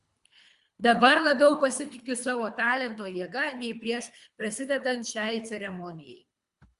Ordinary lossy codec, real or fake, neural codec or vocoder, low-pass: Opus, 64 kbps; fake; codec, 24 kHz, 3 kbps, HILCodec; 10.8 kHz